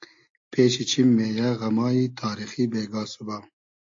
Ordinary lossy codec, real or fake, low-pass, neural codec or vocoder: AAC, 48 kbps; real; 7.2 kHz; none